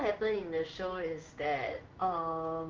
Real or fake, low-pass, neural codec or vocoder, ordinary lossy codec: fake; 7.2 kHz; codec, 16 kHz in and 24 kHz out, 1 kbps, XY-Tokenizer; Opus, 32 kbps